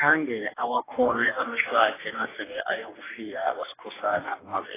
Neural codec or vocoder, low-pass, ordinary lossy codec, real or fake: codec, 44.1 kHz, 2.6 kbps, DAC; 3.6 kHz; AAC, 16 kbps; fake